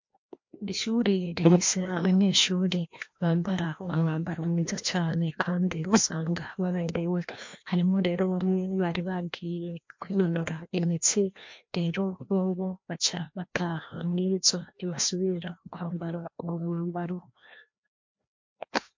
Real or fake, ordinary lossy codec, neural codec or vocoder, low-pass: fake; MP3, 48 kbps; codec, 16 kHz, 1 kbps, FreqCodec, larger model; 7.2 kHz